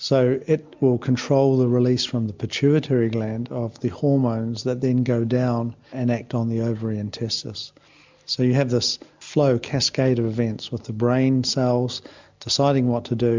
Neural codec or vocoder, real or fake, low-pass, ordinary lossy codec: none; real; 7.2 kHz; MP3, 64 kbps